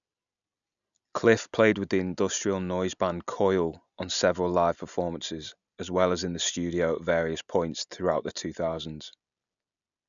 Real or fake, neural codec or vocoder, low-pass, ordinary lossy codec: real; none; 7.2 kHz; none